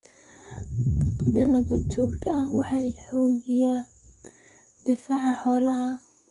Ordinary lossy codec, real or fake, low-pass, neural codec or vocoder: none; fake; 10.8 kHz; codec, 24 kHz, 1 kbps, SNAC